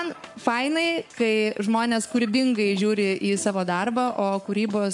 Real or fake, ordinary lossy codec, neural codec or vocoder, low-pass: fake; MP3, 64 kbps; codec, 44.1 kHz, 7.8 kbps, Pupu-Codec; 10.8 kHz